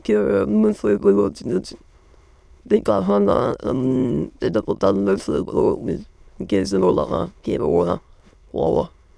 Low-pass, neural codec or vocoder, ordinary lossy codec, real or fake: none; autoencoder, 22.05 kHz, a latent of 192 numbers a frame, VITS, trained on many speakers; none; fake